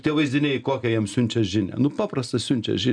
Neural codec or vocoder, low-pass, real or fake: none; 9.9 kHz; real